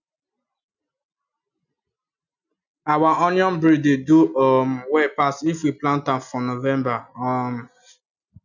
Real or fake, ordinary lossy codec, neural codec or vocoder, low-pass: real; none; none; 7.2 kHz